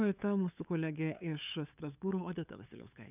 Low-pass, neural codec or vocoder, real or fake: 3.6 kHz; codec, 16 kHz, 8 kbps, FunCodec, trained on LibriTTS, 25 frames a second; fake